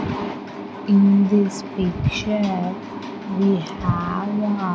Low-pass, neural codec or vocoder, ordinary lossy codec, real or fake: none; none; none; real